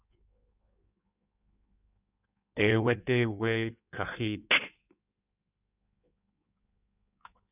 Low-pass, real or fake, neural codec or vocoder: 3.6 kHz; fake; codec, 16 kHz in and 24 kHz out, 1.1 kbps, FireRedTTS-2 codec